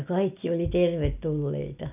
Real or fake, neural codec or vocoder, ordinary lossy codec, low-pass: real; none; none; 3.6 kHz